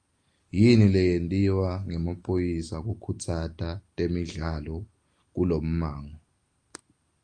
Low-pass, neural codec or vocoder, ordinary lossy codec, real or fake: 9.9 kHz; none; Opus, 32 kbps; real